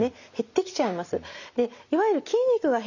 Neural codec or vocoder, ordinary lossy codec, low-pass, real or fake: none; none; 7.2 kHz; real